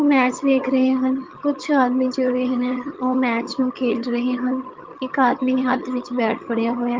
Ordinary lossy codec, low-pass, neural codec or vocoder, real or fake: Opus, 24 kbps; 7.2 kHz; vocoder, 22.05 kHz, 80 mel bands, HiFi-GAN; fake